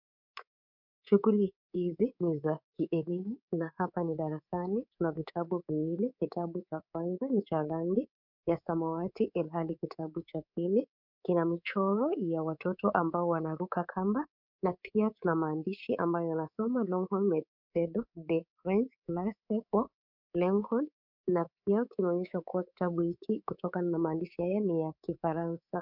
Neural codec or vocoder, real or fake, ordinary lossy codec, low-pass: codec, 24 kHz, 3.1 kbps, DualCodec; fake; MP3, 48 kbps; 5.4 kHz